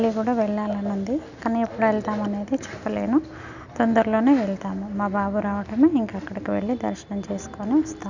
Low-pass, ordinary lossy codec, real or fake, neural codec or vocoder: 7.2 kHz; none; real; none